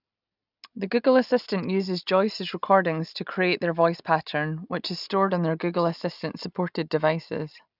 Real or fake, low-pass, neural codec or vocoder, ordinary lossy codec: real; 5.4 kHz; none; none